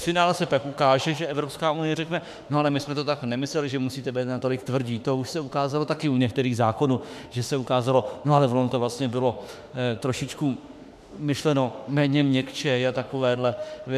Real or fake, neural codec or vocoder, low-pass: fake; autoencoder, 48 kHz, 32 numbers a frame, DAC-VAE, trained on Japanese speech; 14.4 kHz